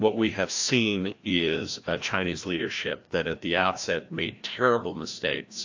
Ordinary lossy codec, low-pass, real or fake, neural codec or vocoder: AAC, 48 kbps; 7.2 kHz; fake; codec, 16 kHz, 1 kbps, FreqCodec, larger model